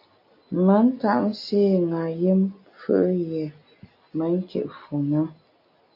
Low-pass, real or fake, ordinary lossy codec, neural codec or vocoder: 5.4 kHz; real; MP3, 32 kbps; none